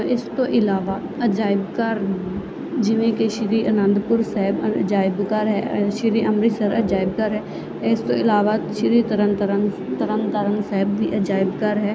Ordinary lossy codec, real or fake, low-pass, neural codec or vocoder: none; real; none; none